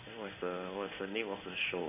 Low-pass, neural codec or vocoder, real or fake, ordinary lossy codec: 3.6 kHz; codec, 16 kHz in and 24 kHz out, 1 kbps, XY-Tokenizer; fake; none